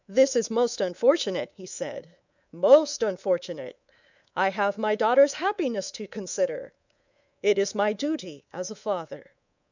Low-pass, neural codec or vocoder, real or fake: 7.2 kHz; codec, 24 kHz, 3.1 kbps, DualCodec; fake